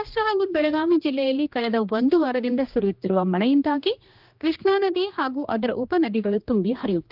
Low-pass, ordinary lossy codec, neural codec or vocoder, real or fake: 5.4 kHz; Opus, 32 kbps; codec, 16 kHz, 2 kbps, X-Codec, HuBERT features, trained on general audio; fake